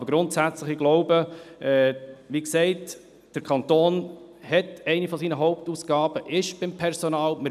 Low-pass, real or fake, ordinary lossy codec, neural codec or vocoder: 14.4 kHz; real; none; none